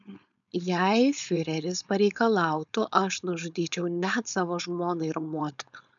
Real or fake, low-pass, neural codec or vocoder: fake; 7.2 kHz; codec, 16 kHz, 4.8 kbps, FACodec